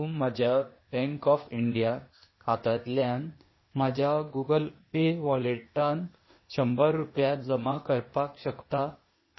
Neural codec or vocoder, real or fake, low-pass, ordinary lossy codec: codec, 16 kHz, 0.8 kbps, ZipCodec; fake; 7.2 kHz; MP3, 24 kbps